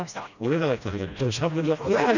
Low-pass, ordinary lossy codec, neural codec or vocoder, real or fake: 7.2 kHz; none; codec, 16 kHz, 1 kbps, FreqCodec, smaller model; fake